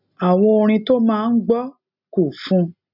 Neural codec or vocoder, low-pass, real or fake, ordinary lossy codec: none; 5.4 kHz; real; none